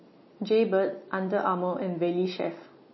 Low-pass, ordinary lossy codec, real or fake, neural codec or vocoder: 7.2 kHz; MP3, 24 kbps; real; none